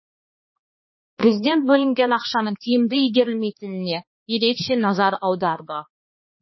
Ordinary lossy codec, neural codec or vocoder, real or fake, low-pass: MP3, 24 kbps; codec, 16 kHz, 2 kbps, X-Codec, HuBERT features, trained on balanced general audio; fake; 7.2 kHz